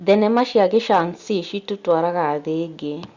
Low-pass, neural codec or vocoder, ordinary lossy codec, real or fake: 7.2 kHz; none; Opus, 64 kbps; real